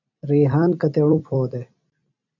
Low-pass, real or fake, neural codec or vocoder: 7.2 kHz; fake; vocoder, 44.1 kHz, 128 mel bands every 256 samples, BigVGAN v2